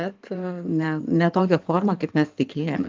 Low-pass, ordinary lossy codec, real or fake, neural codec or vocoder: 7.2 kHz; Opus, 24 kbps; fake; codec, 16 kHz in and 24 kHz out, 2.2 kbps, FireRedTTS-2 codec